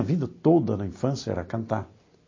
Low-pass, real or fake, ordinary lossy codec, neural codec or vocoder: 7.2 kHz; real; MP3, 32 kbps; none